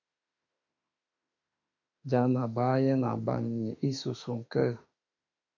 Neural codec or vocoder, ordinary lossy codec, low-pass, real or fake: autoencoder, 48 kHz, 32 numbers a frame, DAC-VAE, trained on Japanese speech; MP3, 48 kbps; 7.2 kHz; fake